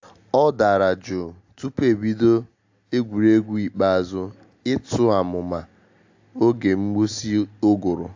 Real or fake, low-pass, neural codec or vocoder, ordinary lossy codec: real; 7.2 kHz; none; AAC, 48 kbps